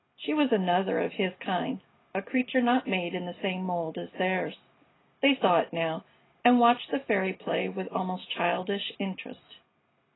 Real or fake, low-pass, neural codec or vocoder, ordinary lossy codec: real; 7.2 kHz; none; AAC, 16 kbps